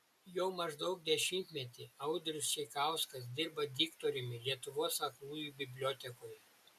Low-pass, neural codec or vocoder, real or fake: 14.4 kHz; none; real